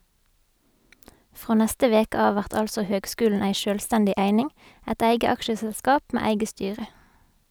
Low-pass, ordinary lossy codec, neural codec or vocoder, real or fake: none; none; none; real